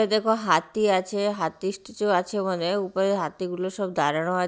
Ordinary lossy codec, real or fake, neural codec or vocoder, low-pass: none; real; none; none